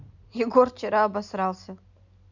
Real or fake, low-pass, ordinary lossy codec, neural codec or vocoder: real; 7.2 kHz; none; none